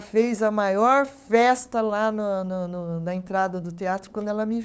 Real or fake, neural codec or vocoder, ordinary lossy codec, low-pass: fake; codec, 16 kHz, 8 kbps, FunCodec, trained on LibriTTS, 25 frames a second; none; none